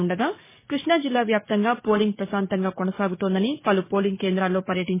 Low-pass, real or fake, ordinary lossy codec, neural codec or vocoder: 3.6 kHz; fake; MP3, 16 kbps; codec, 44.1 kHz, 7.8 kbps, DAC